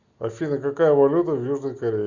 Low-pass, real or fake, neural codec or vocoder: 7.2 kHz; real; none